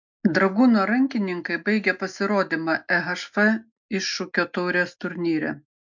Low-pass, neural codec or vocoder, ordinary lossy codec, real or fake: 7.2 kHz; none; MP3, 64 kbps; real